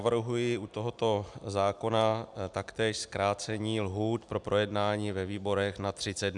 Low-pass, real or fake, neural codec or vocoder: 10.8 kHz; real; none